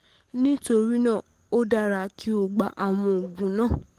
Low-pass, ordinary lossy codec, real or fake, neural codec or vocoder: 14.4 kHz; Opus, 24 kbps; fake; codec, 44.1 kHz, 7.8 kbps, DAC